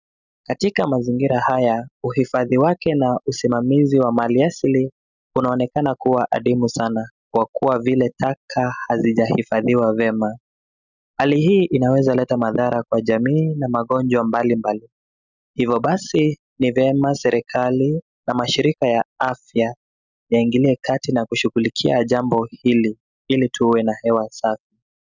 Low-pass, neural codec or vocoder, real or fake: 7.2 kHz; none; real